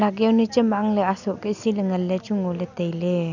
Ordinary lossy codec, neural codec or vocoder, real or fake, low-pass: none; none; real; 7.2 kHz